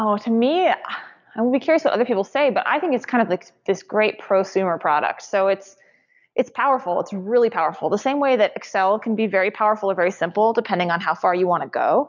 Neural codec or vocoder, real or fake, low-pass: none; real; 7.2 kHz